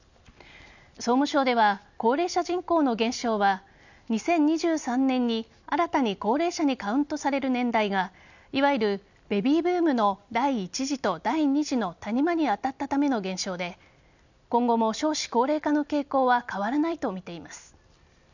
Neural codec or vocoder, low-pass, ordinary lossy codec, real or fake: none; 7.2 kHz; none; real